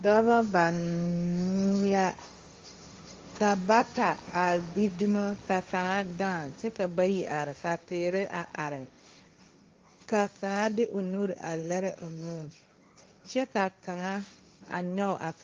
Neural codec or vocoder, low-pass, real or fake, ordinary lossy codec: codec, 16 kHz, 1.1 kbps, Voila-Tokenizer; 7.2 kHz; fake; Opus, 32 kbps